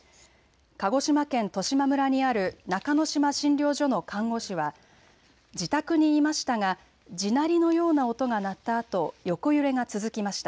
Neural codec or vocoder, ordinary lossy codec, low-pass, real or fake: none; none; none; real